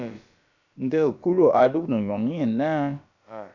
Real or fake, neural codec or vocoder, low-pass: fake; codec, 16 kHz, about 1 kbps, DyCAST, with the encoder's durations; 7.2 kHz